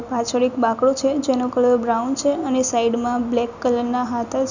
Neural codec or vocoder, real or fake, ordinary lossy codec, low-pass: none; real; none; 7.2 kHz